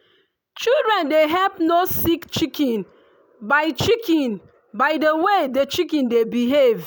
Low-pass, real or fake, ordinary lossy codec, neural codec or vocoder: none; real; none; none